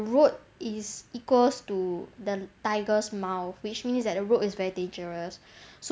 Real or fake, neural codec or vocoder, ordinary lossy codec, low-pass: real; none; none; none